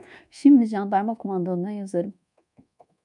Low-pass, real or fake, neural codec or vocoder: 10.8 kHz; fake; codec, 24 kHz, 1.2 kbps, DualCodec